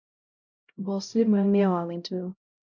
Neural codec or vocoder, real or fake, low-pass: codec, 16 kHz, 0.5 kbps, X-Codec, HuBERT features, trained on LibriSpeech; fake; 7.2 kHz